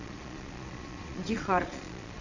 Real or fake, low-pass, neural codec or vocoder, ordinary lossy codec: fake; 7.2 kHz; vocoder, 22.05 kHz, 80 mel bands, Vocos; none